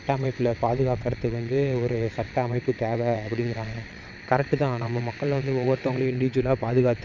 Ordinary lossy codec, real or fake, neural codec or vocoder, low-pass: none; fake; vocoder, 22.05 kHz, 80 mel bands, WaveNeXt; 7.2 kHz